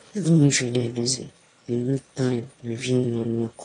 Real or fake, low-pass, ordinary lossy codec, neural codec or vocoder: fake; 9.9 kHz; AAC, 48 kbps; autoencoder, 22.05 kHz, a latent of 192 numbers a frame, VITS, trained on one speaker